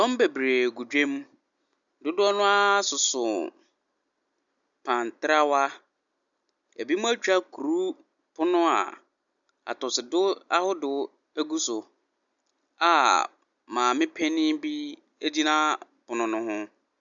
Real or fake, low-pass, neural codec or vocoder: real; 7.2 kHz; none